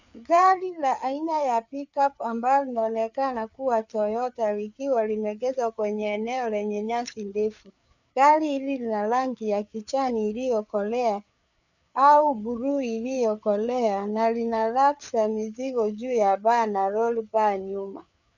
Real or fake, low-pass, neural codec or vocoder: fake; 7.2 kHz; codec, 16 kHz, 4 kbps, FreqCodec, larger model